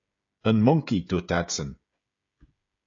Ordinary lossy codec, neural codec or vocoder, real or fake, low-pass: AAC, 48 kbps; codec, 16 kHz, 16 kbps, FreqCodec, smaller model; fake; 7.2 kHz